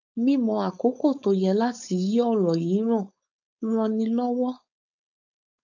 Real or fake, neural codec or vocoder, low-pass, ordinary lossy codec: fake; codec, 16 kHz, 4.8 kbps, FACodec; 7.2 kHz; none